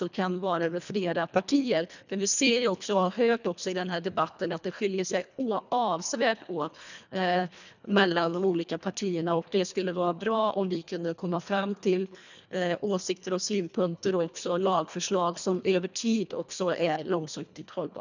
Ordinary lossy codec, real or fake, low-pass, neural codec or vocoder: none; fake; 7.2 kHz; codec, 24 kHz, 1.5 kbps, HILCodec